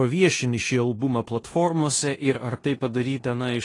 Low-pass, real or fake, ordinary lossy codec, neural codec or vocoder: 10.8 kHz; fake; AAC, 32 kbps; codec, 16 kHz in and 24 kHz out, 0.9 kbps, LongCat-Audio-Codec, four codebook decoder